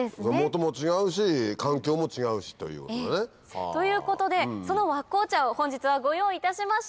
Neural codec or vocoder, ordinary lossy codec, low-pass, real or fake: none; none; none; real